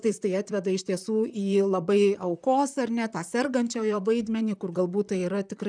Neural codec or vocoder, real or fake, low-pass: vocoder, 44.1 kHz, 128 mel bands, Pupu-Vocoder; fake; 9.9 kHz